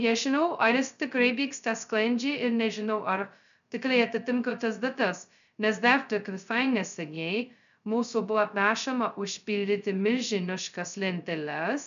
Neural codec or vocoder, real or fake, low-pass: codec, 16 kHz, 0.2 kbps, FocalCodec; fake; 7.2 kHz